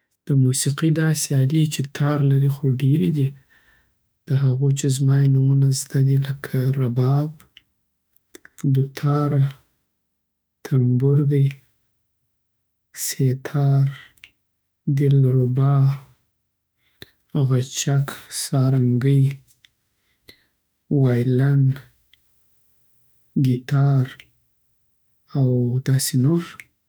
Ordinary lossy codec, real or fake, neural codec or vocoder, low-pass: none; fake; autoencoder, 48 kHz, 32 numbers a frame, DAC-VAE, trained on Japanese speech; none